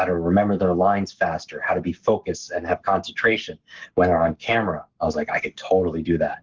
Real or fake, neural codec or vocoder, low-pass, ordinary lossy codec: fake; codec, 44.1 kHz, 7.8 kbps, Pupu-Codec; 7.2 kHz; Opus, 16 kbps